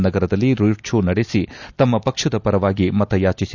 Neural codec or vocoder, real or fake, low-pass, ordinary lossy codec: none; real; 7.2 kHz; none